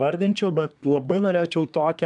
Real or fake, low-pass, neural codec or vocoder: fake; 10.8 kHz; codec, 24 kHz, 1 kbps, SNAC